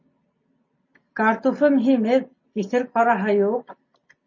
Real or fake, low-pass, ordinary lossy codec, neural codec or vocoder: real; 7.2 kHz; MP3, 32 kbps; none